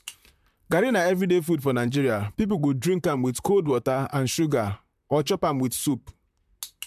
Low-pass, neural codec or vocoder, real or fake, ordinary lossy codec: 14.4 kHz; vocoder, 44.1 kHz, 128 mel bands, Pupu-Vocoder; fake; MP3, 96 kbps